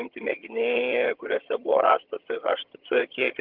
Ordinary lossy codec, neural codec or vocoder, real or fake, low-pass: Opus, 32 kbps; vocoder, 22.05 kHz, 80 mel bands, HiFi-GAN; fake; 5.4 kHz